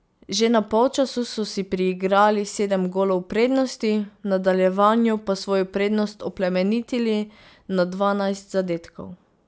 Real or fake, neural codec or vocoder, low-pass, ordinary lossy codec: real; none; none; none